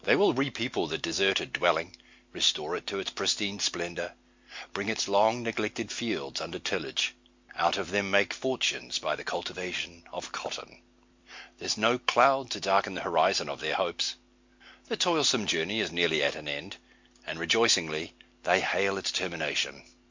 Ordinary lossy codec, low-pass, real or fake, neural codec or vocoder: MP3, 48 kbps; 7.2 kHz; real; none